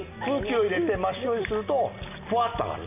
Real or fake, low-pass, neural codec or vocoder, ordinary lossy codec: real; 3.6 kHz; none; none